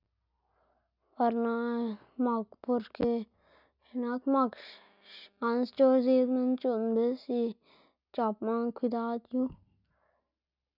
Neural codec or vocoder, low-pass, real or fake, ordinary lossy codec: none; 5.4 kHz; real; none